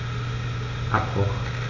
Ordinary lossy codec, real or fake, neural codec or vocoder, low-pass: none; real; none; 7.2 kHz